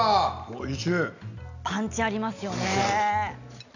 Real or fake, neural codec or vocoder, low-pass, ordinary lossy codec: real; none; 7.2 kHz; none